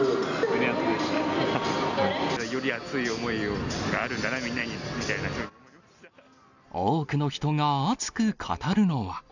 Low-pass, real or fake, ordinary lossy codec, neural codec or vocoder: 7.2 kHz; real; none; none